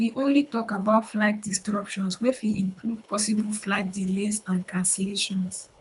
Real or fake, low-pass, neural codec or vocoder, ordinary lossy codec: fake; 10.8 kHz; codec, 24 kHz, 3 kbps, HILCodec; MP3, 96 kbps